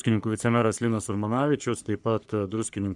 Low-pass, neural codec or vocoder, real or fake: 10.8 kHz; codec, 44.1 kHz, 3.4 kbps, Pupu-Codec; fake